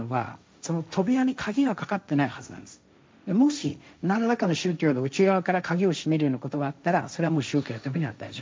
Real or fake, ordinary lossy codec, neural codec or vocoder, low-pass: fake; none; codec, 16 kHz, 1.1 kbps, Voila-Tokenizer; none